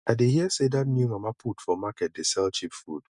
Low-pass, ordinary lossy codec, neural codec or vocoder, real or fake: 10.8 kHz; none; none; real